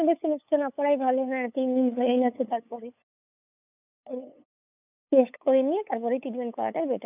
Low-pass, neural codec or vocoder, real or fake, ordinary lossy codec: 3.6 kHz; codec, 16 kHz, 8 kbps, FunCodec, trained on LibriTTS, 25 frames a second; fake; none